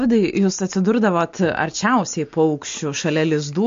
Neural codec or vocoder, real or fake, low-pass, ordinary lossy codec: none; real; 7.2 kHz; MP3, 48 kbps